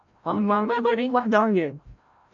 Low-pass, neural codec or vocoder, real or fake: 7.2 kHz; codec, 16 kHz, 0.5 kbps, FreqCodec, larger model; fake